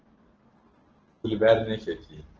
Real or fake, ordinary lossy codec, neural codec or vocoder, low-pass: real; Opus, 16 kbps; none; 7.2 kHz